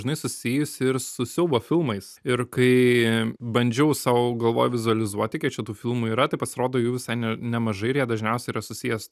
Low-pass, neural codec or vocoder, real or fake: 14.4 kHz; none; real